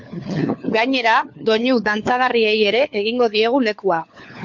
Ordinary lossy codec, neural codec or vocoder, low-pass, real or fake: MP3, 48 kbps; codec, 16 kHz, 4 kbps, FunCodec, trained on LibriTTS, 50 frames a second; 7.2 kHz; fake